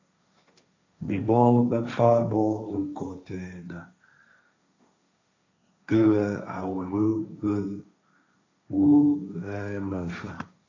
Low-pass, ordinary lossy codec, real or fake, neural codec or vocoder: 7.2 kHz; AAC, 48 kbps; fake; codec, 16 kHz, 1.1 kbps, Voila-Tokenizer